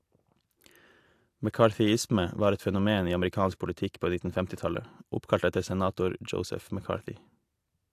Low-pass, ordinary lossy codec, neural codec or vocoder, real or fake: 14.4 kHz; AAC, 64 kbps; none; real